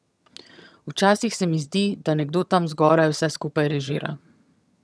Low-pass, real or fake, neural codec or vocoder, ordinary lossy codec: none; fake; vocoder, 22.05 kHz, 80 mel bands, HiFi-GAN; none